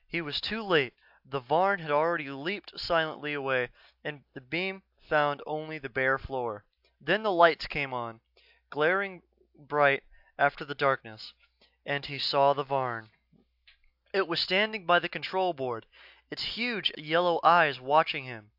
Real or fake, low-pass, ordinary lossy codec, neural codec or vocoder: real; 5.4 kHz; AAC, 48 kbps; none